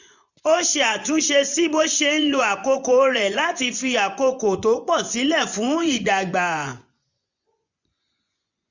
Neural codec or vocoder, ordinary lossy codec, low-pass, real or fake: vocoder, 24 kHz, 100 mel bands, Vocos; none; 7.2 kHz; fake